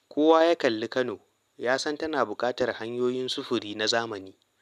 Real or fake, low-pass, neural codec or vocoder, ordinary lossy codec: real; 14.4 kHz; none; none